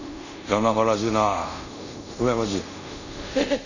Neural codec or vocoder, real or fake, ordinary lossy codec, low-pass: codec, 24 kHz, 0.5 kbps, DualCodec; fake; none; 7.2 kHz